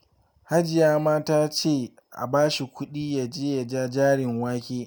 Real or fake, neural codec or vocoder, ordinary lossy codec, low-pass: real; none; none; none